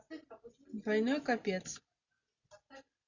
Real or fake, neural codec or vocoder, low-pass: real; none; 7.2 kHz